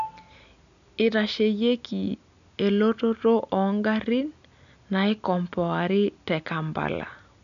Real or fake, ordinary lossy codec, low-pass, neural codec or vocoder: real; none; 7.2 kHz; none